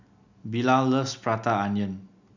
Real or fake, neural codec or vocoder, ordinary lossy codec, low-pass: real; none; none; 7.2 kHz